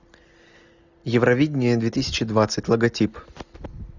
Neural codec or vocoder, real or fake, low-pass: none; real; 7.2 kHz